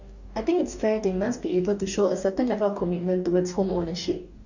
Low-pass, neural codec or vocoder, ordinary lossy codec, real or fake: 7.2 kHz; codec, 44.1 kHz, 2.6 kbps, DAC; none; fake